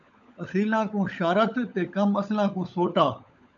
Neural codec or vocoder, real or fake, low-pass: codec, 16 kHz, 16 kbps, FunCodec, trained on LibriTTS, 50 frames a second; fake; 7.2 kHz